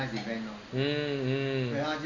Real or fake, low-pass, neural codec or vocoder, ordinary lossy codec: real; 7.2 kHz; none; none